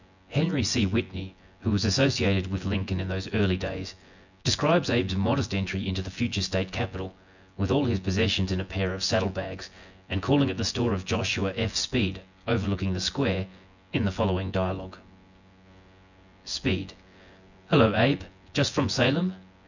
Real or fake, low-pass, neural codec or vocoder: fake; 7.2 kHz; vocoder, 24 kHz, 100 mel bands, Vocos